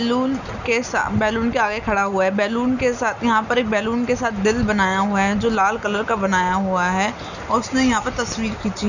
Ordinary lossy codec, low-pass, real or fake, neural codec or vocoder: none; 7.2 kHz; real; none